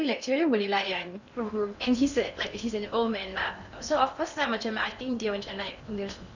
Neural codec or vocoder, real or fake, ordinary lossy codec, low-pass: codec, 16 kHz in and 24 kHz out, 0.8 kbps, FocalCodec, streaming, 65536 codes; fake; none; 7.2 kHz